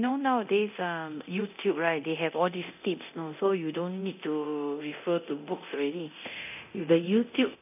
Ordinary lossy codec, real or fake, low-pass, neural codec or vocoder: none; fake; 3.6 kHz; codec, 24 kHz, 0.9 kbps, DualCodec